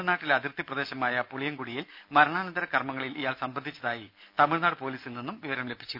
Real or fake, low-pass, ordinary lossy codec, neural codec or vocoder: real; 5.4 kHz; none; none